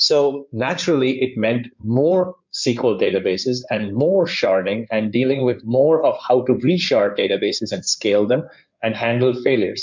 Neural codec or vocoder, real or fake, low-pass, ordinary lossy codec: codec, 16 kHz in and 24 kHz out, 2.2 kbps, FireRedTTS-2 codec; fake; 7.2 kHz; MP3, 64 kbps